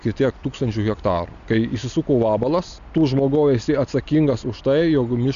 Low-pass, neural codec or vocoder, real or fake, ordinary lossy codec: 7.2 kHz; none; real; MP3, 96 kbps